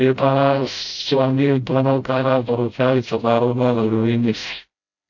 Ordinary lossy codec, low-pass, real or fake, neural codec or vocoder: AAC, 48 kbps; 7.2 kHz; fake; codec, 16 kHz, 0.5 kbps, FreqCodec, smaller model